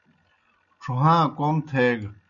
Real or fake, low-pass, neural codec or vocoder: real; 7.2 kHz; none